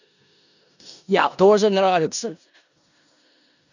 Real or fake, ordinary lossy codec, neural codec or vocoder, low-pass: fake; none; codec, 16 kHz in and 24 kHz out, 0.4 kbps, LongCat-Audio-Codec, four codebook decoder; 7.2 kHz